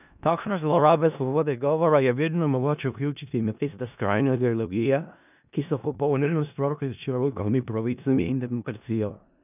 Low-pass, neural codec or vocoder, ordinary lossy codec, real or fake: 3.6 kHz; codec, 16 kHz in and 24 kHz out, 0.4 kbps, LongCat-Audio-Codec, four codebook decoder; none; fake